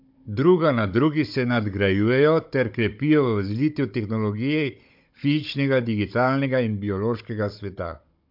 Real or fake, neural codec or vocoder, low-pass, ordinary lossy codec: fake; codec, 16 kHz, 16 kbps, FunCodec, trained on Chinese and English, 50 frames a second; 5.4 kHz; MP3, 48 kbps